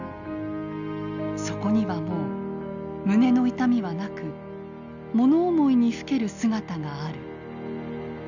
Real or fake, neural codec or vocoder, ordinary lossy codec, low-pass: real; none; none; 7.2 kHz